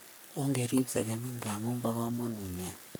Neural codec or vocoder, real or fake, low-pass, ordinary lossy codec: codec, 44.1 kHz, 3.4 kbps, Pupu-Codec; fake; none; none